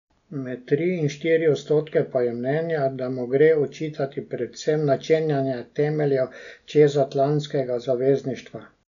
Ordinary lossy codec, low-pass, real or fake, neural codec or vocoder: none; 7.2 kHz; real; none